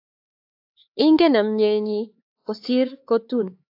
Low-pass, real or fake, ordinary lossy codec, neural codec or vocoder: 5.4 kHz; fake; AAC, 32 kbps; codec, 16 kHz, 4 kbps, X-Codec, HuBERT features, trained on LibriSpeech